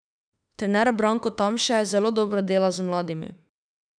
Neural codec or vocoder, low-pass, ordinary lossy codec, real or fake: autoencoder, 48 kHz, 32 numbers a frame, DAC-VAE, trained on Japanese speech; 9.9 kHz; none; fake